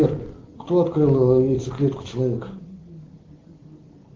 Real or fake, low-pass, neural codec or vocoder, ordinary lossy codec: real; 7.2 kHz; none; Opus, 16 kbps